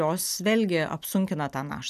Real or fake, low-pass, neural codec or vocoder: real; 14.4 kHz; none